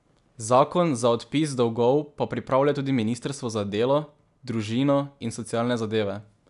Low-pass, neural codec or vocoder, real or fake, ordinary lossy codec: 10.8 kHz; none; real; none